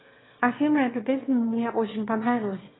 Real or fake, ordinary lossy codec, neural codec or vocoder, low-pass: fake; AAC, 16 kbps; autoencoder, 22.05 kHz, a latent of 192 numbers a frame, VITS, trained on one speaker; 7.2 kHz